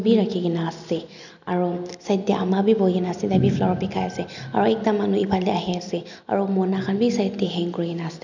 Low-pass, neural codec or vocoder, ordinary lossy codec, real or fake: 7.2 kHz; none; none; real